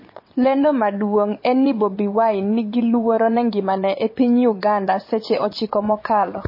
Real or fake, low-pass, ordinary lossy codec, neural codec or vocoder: fake; 5.4 kHz; MP3, 24 kbps; vocoder, 22.05 kHz, 80 mel bands, WaveNeXt